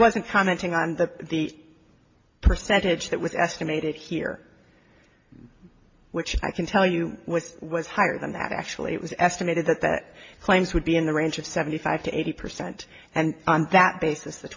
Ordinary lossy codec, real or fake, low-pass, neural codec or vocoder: MP3, 32 kbps; real; 7.2 kHz; none